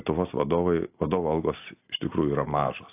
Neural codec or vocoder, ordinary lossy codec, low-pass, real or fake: none; AAC, 24 kbps; 3.6 kHz; real